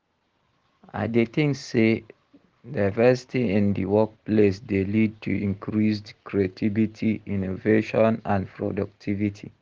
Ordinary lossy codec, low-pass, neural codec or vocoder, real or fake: Opus, 16 kbps; 7.2 kHz; none; real